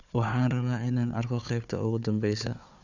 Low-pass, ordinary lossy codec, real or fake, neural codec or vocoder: 7.2 kHz; none; fake; codec, 16 kHz, 4 kbps, FunCodec, trained on Chinese and English, 50 frames a second